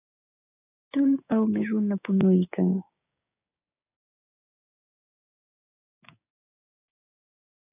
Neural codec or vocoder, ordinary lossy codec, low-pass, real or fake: codec, 16 kHz, 6 kbps, DAC; AAC, 32 kbps; 3.6 kHz; fake